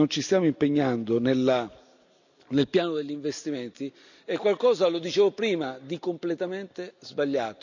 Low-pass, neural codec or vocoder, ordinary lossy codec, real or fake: 7.2 kHz; none; none; real